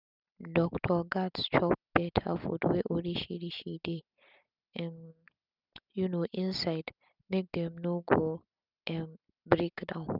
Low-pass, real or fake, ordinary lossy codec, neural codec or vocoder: 5.4 kHz; real; none; none